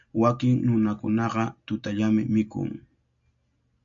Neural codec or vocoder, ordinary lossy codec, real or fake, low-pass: none; AAC, 64 kbps; real; 7.2 kHz